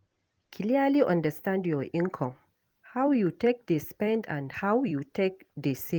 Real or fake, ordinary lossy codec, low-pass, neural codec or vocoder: fake; Opus, 24 kbps; 19.8 kHz; vocoder, 44.1 kHz, 128 mel bands every 256 samples, BigVGAN v2